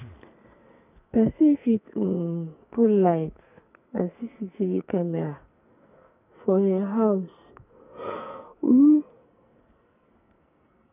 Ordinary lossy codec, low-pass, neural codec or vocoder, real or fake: none; 3.6 kHz; codec, 44.1 kHz, 2.6 kbps, SNAC; fake